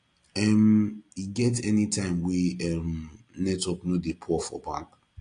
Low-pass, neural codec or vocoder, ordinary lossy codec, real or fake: 9.9 kHz; none; AAC, 48 kbps; real